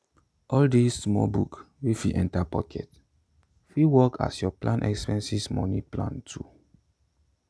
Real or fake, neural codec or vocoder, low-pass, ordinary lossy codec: real; none; none; none